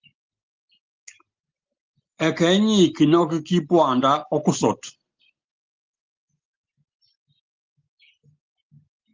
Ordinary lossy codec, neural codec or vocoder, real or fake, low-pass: Opus, 24 kbps; none; real; 7.2 kHz